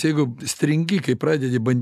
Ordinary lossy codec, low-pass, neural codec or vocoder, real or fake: AAC, 96 kbps; 14.4 kHz; none; real